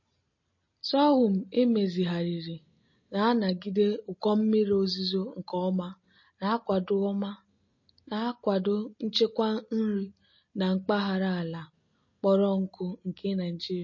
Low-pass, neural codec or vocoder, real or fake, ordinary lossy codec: 7.2 kHz; none; real; MP3, 32 kbps